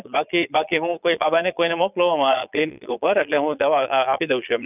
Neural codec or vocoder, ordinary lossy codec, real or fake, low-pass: vocoder, 22.05 kHz, 80 mel bands, Vocos; none; fake; 3.6 kHz